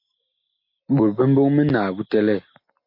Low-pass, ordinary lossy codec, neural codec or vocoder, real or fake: 5.4 kHz; MP3, 32 kbps; none; real